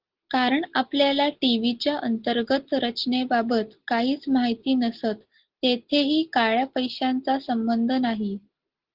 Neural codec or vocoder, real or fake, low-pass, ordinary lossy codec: none; real; 5.4 kHz; Opus, 16 kbps